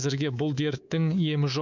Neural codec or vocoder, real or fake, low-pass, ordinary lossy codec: codec, 24 kHz, 3.1 kbps, DualCodec; fake; 7.2 kHz; none